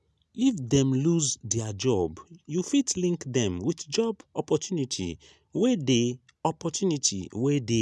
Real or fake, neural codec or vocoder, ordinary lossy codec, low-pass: real; none; none; none